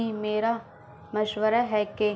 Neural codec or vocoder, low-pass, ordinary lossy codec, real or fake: none; none; none; real